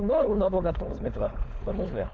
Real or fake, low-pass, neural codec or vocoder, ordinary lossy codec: fake; none; codec, 16 kHz, 4.8 kbps, FACodec; none